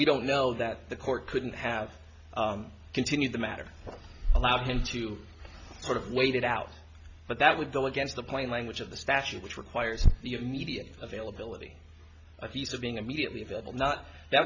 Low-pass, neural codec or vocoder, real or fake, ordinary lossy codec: 7.2 kHz; none; real; MP3, 48 kbps